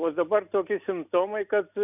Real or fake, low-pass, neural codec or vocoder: real; 3.6 kHz; none